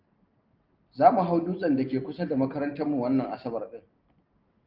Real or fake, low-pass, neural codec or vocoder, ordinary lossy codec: real; 5.4 kHz; none; Opus, 32 kbps